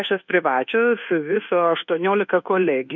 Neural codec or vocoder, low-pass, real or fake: codec, 24 kHz, 1.2 kbps, DualCodec; 7.2 kHz; fake